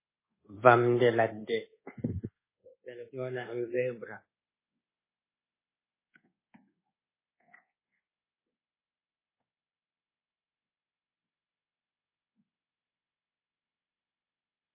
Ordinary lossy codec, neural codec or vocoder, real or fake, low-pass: MP3, 16 kbps; codec, 24 kHz, 1.2 kbps, DualCodec; fake; 3.6 kHz